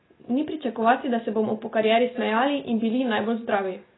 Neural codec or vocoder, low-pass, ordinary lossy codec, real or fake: none; 7.2 kHz; AAC, 16 kbps; real